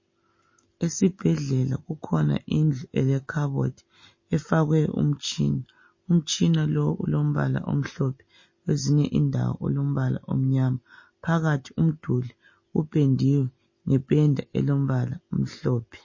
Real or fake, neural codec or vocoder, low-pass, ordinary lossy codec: real; none; 7.2 kHz; MP3, 32 kbps